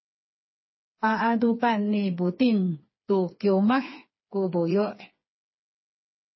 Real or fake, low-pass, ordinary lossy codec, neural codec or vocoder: fake; 7.2 kHz; MP3, 24 kbps; codec, 16 kHz, 4 kbps, FreqCodec, smaller model